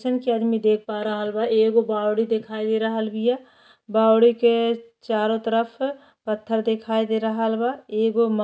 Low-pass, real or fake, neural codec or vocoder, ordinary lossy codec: none; real; none; none